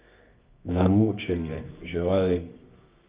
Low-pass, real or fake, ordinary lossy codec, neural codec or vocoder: 3.6 kHz; fake; Opus, 32 kbps; codec, 24 kHz, 0.9 kbps, WavTokenizer, medium music audio release